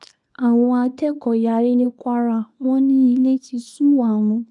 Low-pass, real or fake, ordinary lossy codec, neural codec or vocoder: 10.8 kHz; fake; none; codec, 24 kHz, 0.9 kbps, WavTokenizer, small release